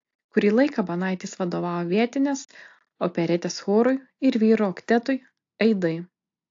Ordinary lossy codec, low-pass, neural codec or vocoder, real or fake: AAC, 48 kbps; 7.2 kHz; none; real